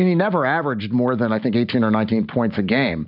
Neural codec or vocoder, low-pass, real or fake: none; 5.4 kHz; real